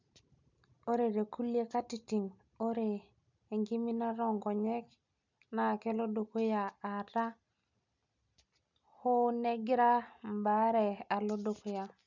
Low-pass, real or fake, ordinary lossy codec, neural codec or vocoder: 7.2 kHz; real; none; none